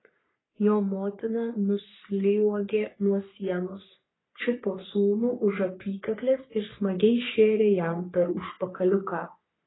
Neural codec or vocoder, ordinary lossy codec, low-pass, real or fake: codec, 44.1 kHz, 3.4 kbps, Pupu-Codec; AAC, 16 kbps; 7.2 kHz; fake